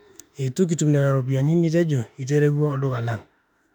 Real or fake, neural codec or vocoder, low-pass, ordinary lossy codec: fake; autoencoder, 48 kHz, 32 numbers a frame, DAC-VAE, trained on Japanese speech; 19.8 kHz; none